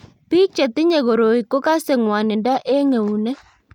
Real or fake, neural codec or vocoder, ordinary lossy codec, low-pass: real; none; none; 19.8 kHz